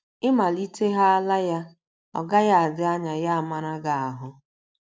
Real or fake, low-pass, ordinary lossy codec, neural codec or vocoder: real; none; none; none